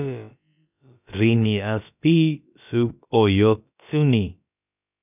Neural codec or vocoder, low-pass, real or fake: codec, 16 kHz, about 1 kbps, DyCAST, with the encoder's durations; 3.6 kHz; fake